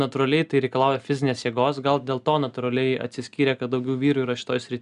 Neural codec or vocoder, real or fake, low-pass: none; real; 10.8 kHz